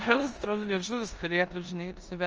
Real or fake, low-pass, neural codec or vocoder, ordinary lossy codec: fake; 7.2 kHz; codec, 16 kHz in and 24 kHz out, 0.9 kbps, LongCat-Audio-Codec, four codebook decoder; Opus, 24 kbps